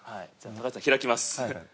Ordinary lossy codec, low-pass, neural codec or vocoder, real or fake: none; none; none; real